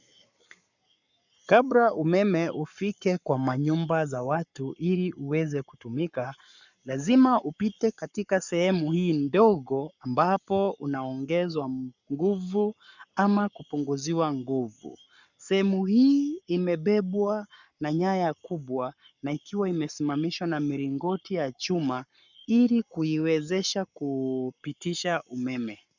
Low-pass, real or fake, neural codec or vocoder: 7.2 kHz; fake; codec, 44.1 kHz, 7.8 kbps, Pupu-Codec